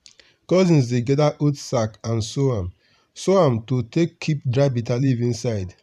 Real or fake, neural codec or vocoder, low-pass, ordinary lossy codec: fake; vocoder, 44.1 kHz, 128 mel bands every 512 samples, BigVGAN v2; 14.4 kHz; none